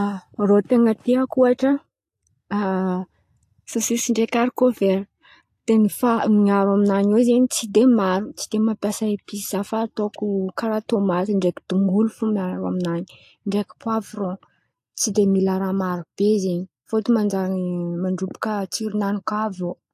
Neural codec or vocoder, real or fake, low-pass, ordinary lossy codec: none; real; 14.4 kHz; AAC, 64 kbps